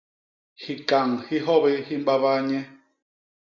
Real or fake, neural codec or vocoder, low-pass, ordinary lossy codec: real; none; 7.2 kHz; Opus, 64 kbps